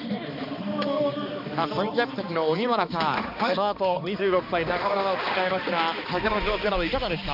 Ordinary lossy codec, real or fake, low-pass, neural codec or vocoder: none; fake; 5.4 kHz; codec, 16 kHz, 2 kbps, X-Codec, HuBERT features, trained on balanced general audio